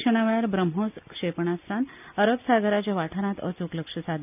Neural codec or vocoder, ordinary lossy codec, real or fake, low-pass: none; none; real; 3.6 kHz